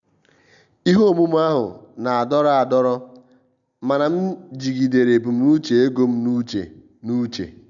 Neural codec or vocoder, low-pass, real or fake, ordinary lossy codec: none; 7.2 kHz; real; none